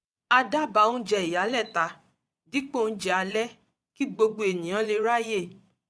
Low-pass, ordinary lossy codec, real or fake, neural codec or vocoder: none; none; fake; vocoder, 22.05 kHz, 80 mel bands, Vocos